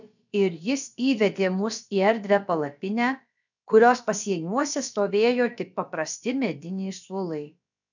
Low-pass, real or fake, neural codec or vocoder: 7.2 kHz; fake; codec, 16 kHz, about 1 kbps, DyCAST, with the encoder's durations